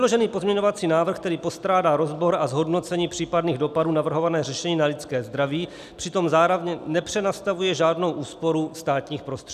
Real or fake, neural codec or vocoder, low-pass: real; none; 14.4 kHz